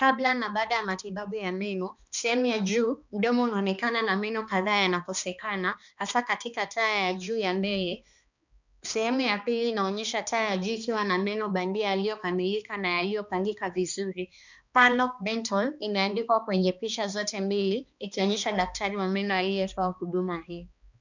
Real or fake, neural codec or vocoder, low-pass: fake; codec, 16 kHz, 2 kbps, X-Codec, HuBERT features, trained on balanced general audio; 7.2 kHz